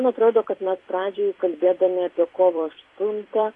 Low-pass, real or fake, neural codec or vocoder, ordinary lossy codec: 10.8 kHz; fake; vocoder, 44.1 kHz, 128 mel bands every 256 samples, BigVGAN v2; AAC, 32 kbps